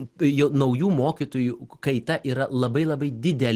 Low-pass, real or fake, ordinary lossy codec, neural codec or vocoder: 14.4 kHz; real; Opus, 16 kbps; none